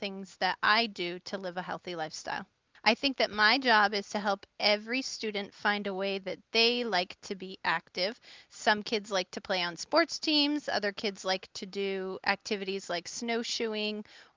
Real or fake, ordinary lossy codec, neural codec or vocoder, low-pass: real; Opus, 24 kbps; none; 7.2 kHz